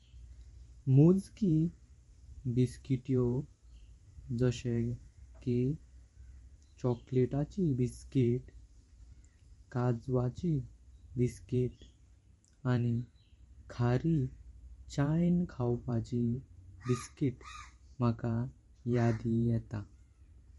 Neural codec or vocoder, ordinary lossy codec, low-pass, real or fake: vocoder, 44.1 kHz, 128 mel bands every 512 samples, BigVGAN v2; MP3, 48 kbps; 19.8 kHz; fake